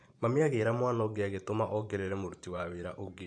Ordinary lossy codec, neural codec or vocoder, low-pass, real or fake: AAC, 64 kbps; vocoder, 44.1 kHz, 128 mel bands every 512 samples, BigVGAN v2; 9.9 kHz; fake